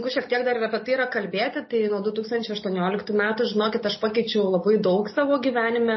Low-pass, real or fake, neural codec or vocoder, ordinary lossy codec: 7.2 kHz; real; none; MP3, 24 kbps